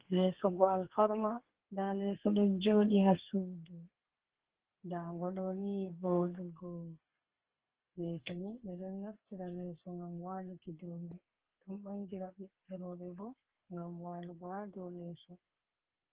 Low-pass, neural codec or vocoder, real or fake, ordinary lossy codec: 3.6 kHz; codec, 32 kHz, 1.9 kbps, SNAC; fake; Opus, 16 kbps